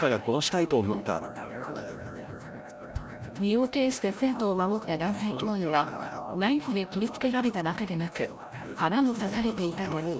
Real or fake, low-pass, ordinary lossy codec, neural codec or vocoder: fake; none; none; codec, 16 kHz, 0.5 kbps, FreqCodec, larger model